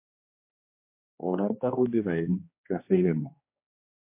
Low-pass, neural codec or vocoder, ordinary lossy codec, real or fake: 3.6 kHz; codec, 16 kHz, 2 kbps, X-Codec, HuBERT features, trained on general audio; MP3, 24 kbps; fake